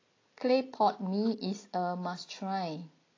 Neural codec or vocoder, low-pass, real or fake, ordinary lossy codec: none; 7.2 kHz; real; AAC, 32 kbps